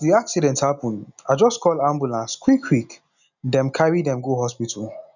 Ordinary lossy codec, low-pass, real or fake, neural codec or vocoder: none; 7.2 kHz; real; none